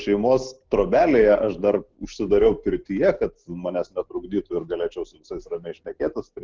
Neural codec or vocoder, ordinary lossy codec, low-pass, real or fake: none; Opus, 16 kbps; 7.2 kHz; real